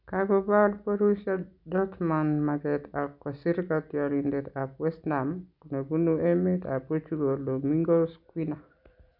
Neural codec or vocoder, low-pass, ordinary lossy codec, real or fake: none; 5.4 kHz; none; real